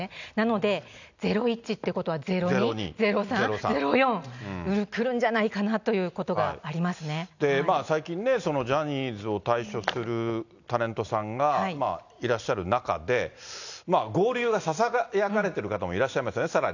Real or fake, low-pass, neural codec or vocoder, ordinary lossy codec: real; 7.2 kHz; none; none